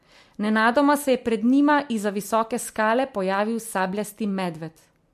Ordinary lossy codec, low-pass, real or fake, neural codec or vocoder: MP3, 64 kbps; 14.4 kHz; real; none